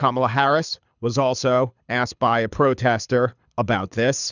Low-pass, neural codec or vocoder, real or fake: 7.2 kHz; codec, 24 kHz, 6 kbps, HILCodec; fake